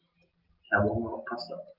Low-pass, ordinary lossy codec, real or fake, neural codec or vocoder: 5.4 kHz; Opus, 24 kbps; real; none